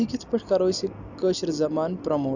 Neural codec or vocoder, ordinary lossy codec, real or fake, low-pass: none; none; real; 7.2 kHz